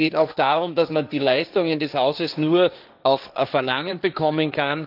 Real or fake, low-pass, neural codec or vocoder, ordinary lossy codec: fake; 5.4 kHz; codec, 16 kHz, 1.1 kbps, Voila-Tokenizer; none